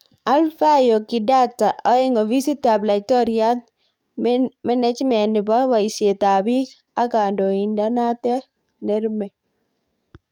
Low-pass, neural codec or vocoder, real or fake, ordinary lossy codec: 19.8 kHz; codec, 44.1 kHz, 7.8 kbps, DAC; fake; none